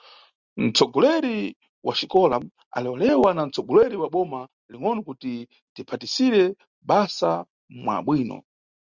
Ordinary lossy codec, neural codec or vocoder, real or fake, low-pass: Opus, 64 kbps; none; real; 7.2 kHz